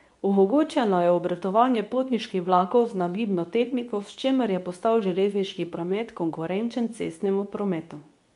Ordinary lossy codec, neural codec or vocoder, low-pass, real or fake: MP3, 64 kbps; codec, 24 kHz, 0.9 kbps, WavTokenizer, medium speech release version 2; 10.8 kHz; fake